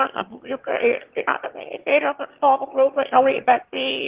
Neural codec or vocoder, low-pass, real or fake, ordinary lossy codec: autoencoder, 22.05 kHz, a latent of 192 numbers a frame, VITS, trained on one speaker; 3.6 kHz; fake; Opus, 16 kbps